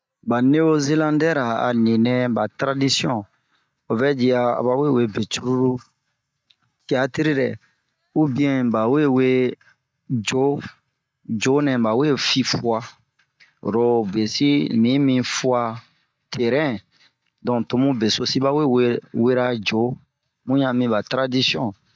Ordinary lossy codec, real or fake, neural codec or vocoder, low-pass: none; real; none; none